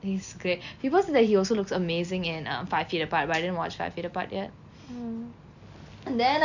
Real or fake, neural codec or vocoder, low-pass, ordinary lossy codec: real; none; 7.2 kHz; none